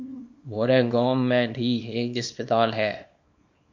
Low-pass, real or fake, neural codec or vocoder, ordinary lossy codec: 7.2 kHz; fake; codec, 24 kHz, 0.9 kbps, WavTokenizer, small release; MP3, 48 kbps